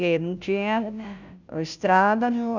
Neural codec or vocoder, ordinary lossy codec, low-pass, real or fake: codec, 16 kHz, 0.5 kbps, FunCodec, trained on Chinese and English, 25 frames a second; none; 7.2 kHz; fake